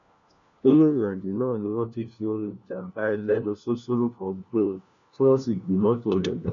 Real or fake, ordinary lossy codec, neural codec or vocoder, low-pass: fake; none; codec, 16 kHz, 1 kbps, FunCodec, trained on LibriTTS, 50 frames a second; 7.2 kHz